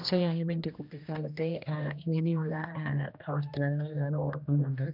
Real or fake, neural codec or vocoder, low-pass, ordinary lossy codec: fake; codec, 16 kHz, 1 kbps, X-Codec, HuBERT features, trained on general audio; 5.4 kHz; none